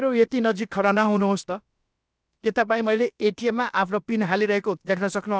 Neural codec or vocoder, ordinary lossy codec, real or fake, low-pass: codec, 16 kHz, about 1 kbps, DyCAST, with the encoder's durations; none; fake; none